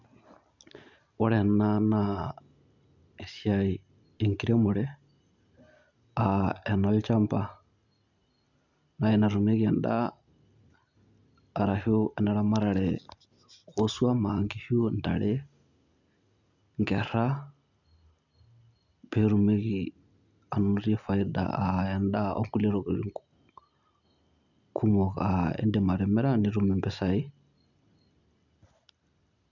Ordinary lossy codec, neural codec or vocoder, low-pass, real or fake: none; none; 7.2 kHz; real